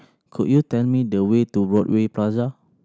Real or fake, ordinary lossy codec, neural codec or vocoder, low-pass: real; none; none; none